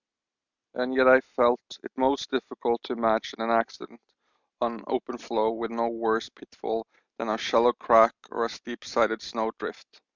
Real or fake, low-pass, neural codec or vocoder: real; 7.2 kHz; none